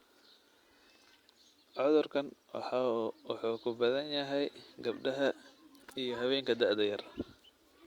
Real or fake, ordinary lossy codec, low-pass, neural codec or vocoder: real; Opus, 64 kbps; 19.8 kHz; none